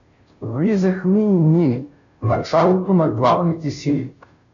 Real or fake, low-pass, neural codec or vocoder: fake; 7.2 kHz; codec, 16 kHz, 0.5 kbps, FunCodec, trained on Chinese and English, 25 frames a second